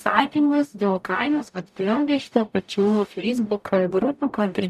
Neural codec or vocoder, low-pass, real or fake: codec, 44.1 kHz, 0.9 kbps, DAC; 14.4 kHz; fake